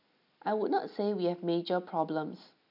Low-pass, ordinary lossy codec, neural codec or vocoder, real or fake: 5.4 kHz; none; none; real